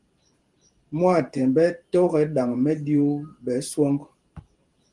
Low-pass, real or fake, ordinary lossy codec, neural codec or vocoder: 10.8 kHz; fake; Opus, 24 kbps; vocoder, 44.1 kHz, 128 mel bands every 512 samples, BigVGAN v2